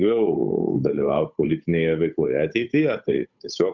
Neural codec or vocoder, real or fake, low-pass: codec, 16 kHz, 8 kbps, FunCodec, trained on Chinese and English, 25 frames a second; fake; 7.2 kHz